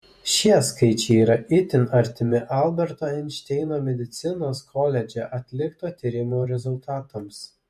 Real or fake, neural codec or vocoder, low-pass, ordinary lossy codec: fake; vocoder, 48 kHz, 128 mel bands, Vocos; 14.4 kHz; MP3, 64 kbps